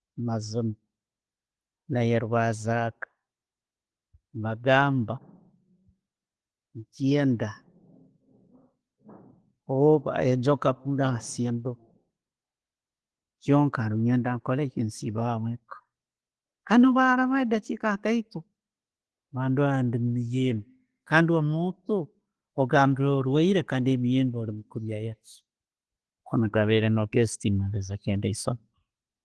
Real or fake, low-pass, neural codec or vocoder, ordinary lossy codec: real; 10.8 kHz; none; Opus, 16 kbps